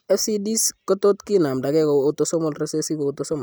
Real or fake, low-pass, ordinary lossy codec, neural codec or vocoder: real; none; none; none